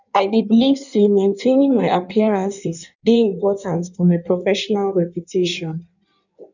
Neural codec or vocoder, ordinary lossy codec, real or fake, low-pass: codec, 16 kHz in and 24 kHz out, 1.1 kbps, FireRedTTS-2 codec; none; fake; 7.2 kHz